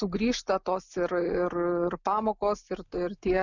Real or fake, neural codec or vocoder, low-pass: real; none; 7.2 kHz